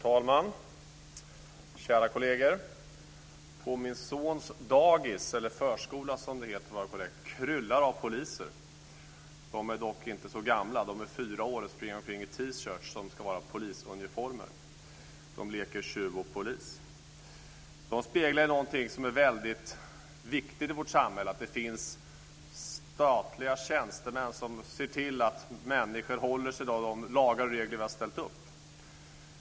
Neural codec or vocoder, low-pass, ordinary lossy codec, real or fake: none; none; none; real